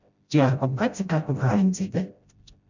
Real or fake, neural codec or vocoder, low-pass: fake; codec, 16 kHz, 0.5 kbps, FreqCodec, smaller model; 7.2 kHz